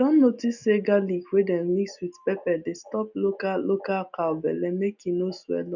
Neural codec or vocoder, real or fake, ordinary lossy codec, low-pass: none; real; none; 7.2 kHz